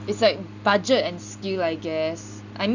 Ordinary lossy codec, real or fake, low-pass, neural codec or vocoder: none; real; 7.2 kHz; none